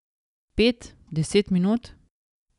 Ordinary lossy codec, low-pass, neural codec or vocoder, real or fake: none; 10.8 kHz; none; real